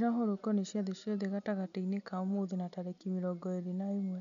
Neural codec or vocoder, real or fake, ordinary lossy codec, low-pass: none; real; none; 7.2 kHz